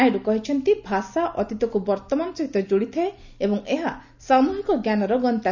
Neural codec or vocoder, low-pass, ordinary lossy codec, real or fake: none; 7.2 kHz; none; real